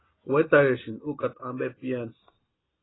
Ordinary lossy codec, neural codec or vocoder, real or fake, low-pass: AAC, 16 kbps; none; real; 7.2 kHz